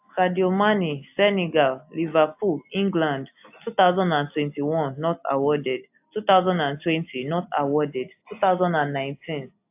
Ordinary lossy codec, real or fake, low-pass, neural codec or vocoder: AAC, 32 kbps; real; 3.6 kHz; none